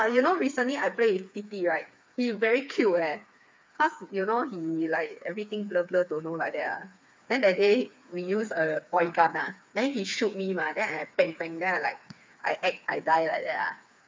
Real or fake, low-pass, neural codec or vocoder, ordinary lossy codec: fake; none; codec, 16 kHz, 4 kbps, FreqCodec, smaller model; none